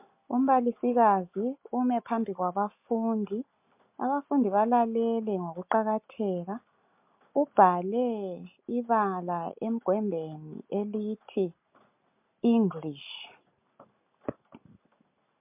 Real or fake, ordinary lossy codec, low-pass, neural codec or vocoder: real; MP3, 32 kbps; 3.6 kHz; none